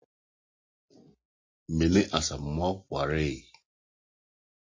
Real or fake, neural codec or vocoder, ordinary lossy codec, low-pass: real; none; MP3, 32 kbps; 7.2 kHz